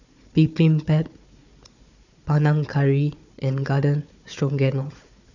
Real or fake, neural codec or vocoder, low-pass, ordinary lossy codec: fake; codec, 16 kHz, 4 kbps, FunCodec, trained on Chinese and English, 50 frames a second; 7.2 kHz; none